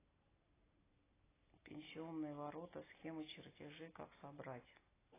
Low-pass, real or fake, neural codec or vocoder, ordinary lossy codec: 3.6 kHz; real; none; AAC, 16 kbps